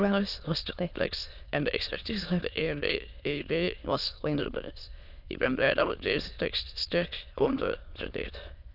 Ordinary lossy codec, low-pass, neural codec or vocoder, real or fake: none; 5.4 kHz; autoencoder, 22.05 kHz, a latent of 192 numbers a frame, VITS, trained on many speakers; fake